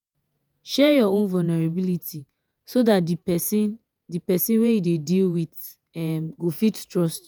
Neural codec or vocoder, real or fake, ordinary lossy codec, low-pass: vocoder, 48 kHz, 128 mel bands, Vocos; fake; none; none